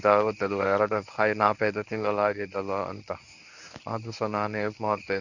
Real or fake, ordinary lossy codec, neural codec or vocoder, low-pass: fake; none; codec, 24 kHz, 0.9 kbps, WavTokenizer, medium speech release version 1; 7.2 kHz